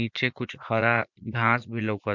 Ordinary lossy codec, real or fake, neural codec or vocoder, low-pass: MP3, 64 kbps; fake; vocoder, 44.1 kHz, 80 mel bands, Vocos; 7.2 kHz